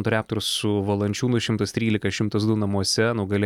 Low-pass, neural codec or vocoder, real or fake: 19.8 kHz; none; real